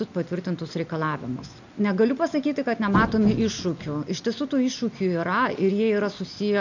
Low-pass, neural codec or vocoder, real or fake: 7.2 kHz; none; real